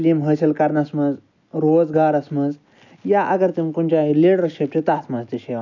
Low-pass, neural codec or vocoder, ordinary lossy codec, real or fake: 7.2 kHz; none; none; real